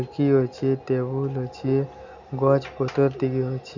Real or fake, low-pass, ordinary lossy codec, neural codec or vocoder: real; 7.2 kHz; none; none